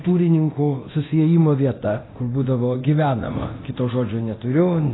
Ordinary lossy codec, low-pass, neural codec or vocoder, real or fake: AAC, 16 kbps; 7.2 kHz; codec, 24 kHz, 0.9 kbps, DualCodec; fake